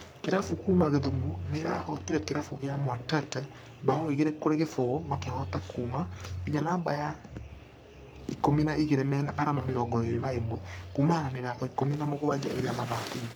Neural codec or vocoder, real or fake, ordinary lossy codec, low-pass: codec, 44.1 kHz, 3.4 kbps, Pupu-Codec; fake; none; none